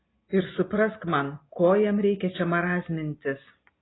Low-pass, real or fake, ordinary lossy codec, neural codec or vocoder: 7.2 kHz; real; AAC, 16 kbps; none